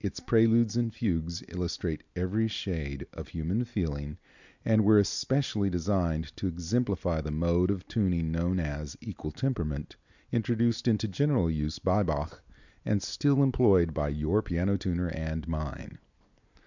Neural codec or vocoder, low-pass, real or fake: none; 7.2 kHz; real